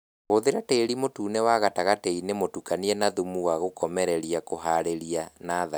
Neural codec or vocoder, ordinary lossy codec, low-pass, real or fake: none; none; none; real